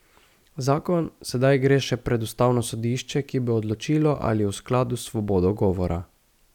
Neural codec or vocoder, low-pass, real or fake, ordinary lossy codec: none; 19.8 kHz; real; none